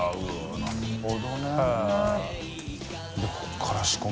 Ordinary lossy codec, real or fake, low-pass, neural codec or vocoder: none; real; none; none